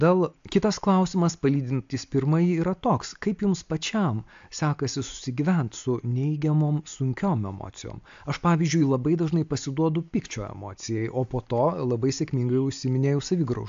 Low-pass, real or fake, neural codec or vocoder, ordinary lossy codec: 7.2 kHz; real; none; AAC, 64 kbps